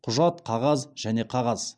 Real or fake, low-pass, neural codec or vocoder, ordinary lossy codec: real; none; none; none